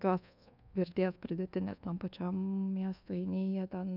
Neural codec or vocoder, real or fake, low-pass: codec, 24 kHz, 1.2 kbps, DualCodec; fake; 5.4 kHz